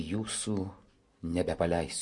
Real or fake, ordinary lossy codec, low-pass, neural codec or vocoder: real; MP3, 48 kbps; 10.8 kHz; none